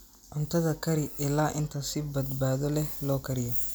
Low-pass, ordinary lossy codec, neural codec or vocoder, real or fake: none; none; none; real